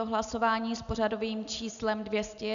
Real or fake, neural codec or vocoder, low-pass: real; none; 7.2 kHz